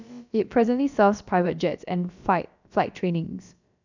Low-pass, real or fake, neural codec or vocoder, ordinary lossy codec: 7.2 kHz; fake; codec, 16 kHz, about 1 kbps, DyCAST, with the encoder's durations; none